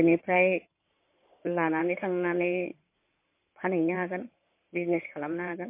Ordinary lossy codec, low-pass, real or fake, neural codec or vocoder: MP3, 24 kbps; 3.6 kHz; fake; codec, 16 kHz in and 24 kHz out, 2.2 kbps, FireRedTTS-2 codec